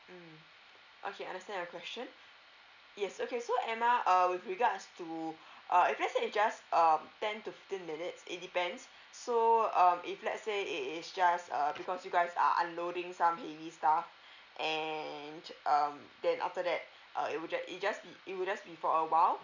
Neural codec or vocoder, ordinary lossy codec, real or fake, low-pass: none; none; real; 7.2 kHz